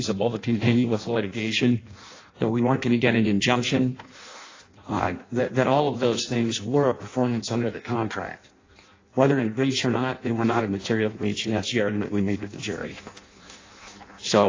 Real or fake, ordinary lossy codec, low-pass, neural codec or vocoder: fake; AAC, 32 kbps; 7.2 kHz; codec, 16 kHz in and 24 kHz out, 0.6 kbps, FireRedTTS-2 codec